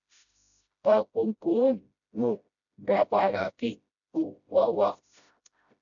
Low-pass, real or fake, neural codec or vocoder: 7.2 kHz; fake; codec, 16 kHz, 0.5 kbps, FreqCodec, smaller model